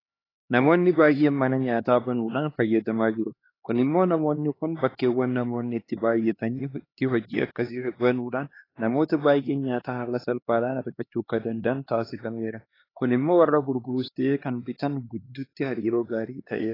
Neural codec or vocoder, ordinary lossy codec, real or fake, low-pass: codec, 16 kHz, 2 kbps, X-Codec, HuBERT features, trained on LibriSpeech; AAC, 24 kbps; fake; 5.4 kHz